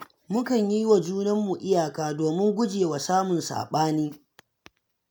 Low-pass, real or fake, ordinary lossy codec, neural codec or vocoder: none; real; none; none